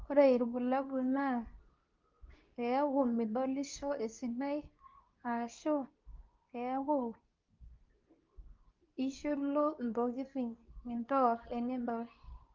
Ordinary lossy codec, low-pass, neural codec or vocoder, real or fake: Opus, 24 kbps; 7.2 kHz; codec, 24 kHz, 0.9 kbps, WavTokenizer, medium speech release version 2; fake